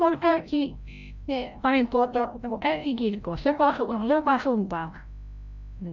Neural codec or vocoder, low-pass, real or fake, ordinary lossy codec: codec, 16 kHz, 0.5 kbps, FreqCodec, larger model; 7.2 kHz; fake; none